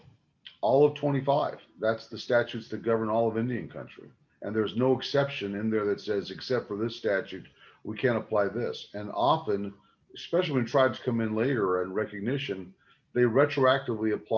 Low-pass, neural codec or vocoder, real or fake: 7.2 kHz; none; real